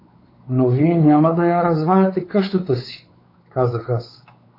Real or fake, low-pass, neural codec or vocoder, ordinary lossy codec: fake; 5.4 kHz; codec, 16 kHz, 4 kbps, X-Codec, WavLM features, trained on Multilingual LibriSpeech; AAC, 32 kbps